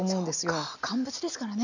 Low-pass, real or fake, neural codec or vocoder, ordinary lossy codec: 7.2 kHz; real; none; none